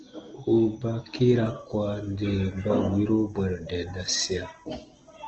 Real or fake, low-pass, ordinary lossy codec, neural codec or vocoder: real; 7.2 kHz; Opus, 16 kbps; none